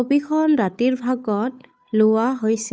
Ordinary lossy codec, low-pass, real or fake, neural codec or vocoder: none; none; fake; codec, 16 kHz, 8 kbps, FunCodec, trained on Chinese and English, 25 frames a second